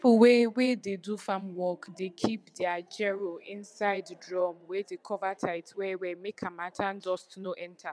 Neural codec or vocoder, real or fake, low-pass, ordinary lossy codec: vocoder, 22.05 kHz, 80 mel bands, WaveNeXt; fake; none; none